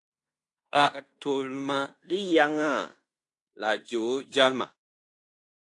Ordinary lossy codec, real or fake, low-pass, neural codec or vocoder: AAC, 48 kbps; fake; 10.8 kHz; codec, 16 kHz in and 24 kHz out, 0.9 kbps, LongCat-Audio-Codec, fine tuned four codebook decoder